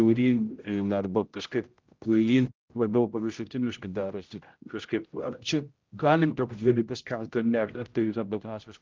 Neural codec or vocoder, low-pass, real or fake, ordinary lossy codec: codec, 16 kHz, 0.5 kbps, X-Codec, HuBERT features, trained on general audio; 7.2 kHz; fake; Opus, 32 kbps